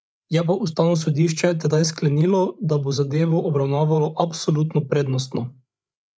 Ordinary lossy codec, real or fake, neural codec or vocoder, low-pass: none; fake; codec, 16 kHz, 8 kbps, FreqCodec, larger model; none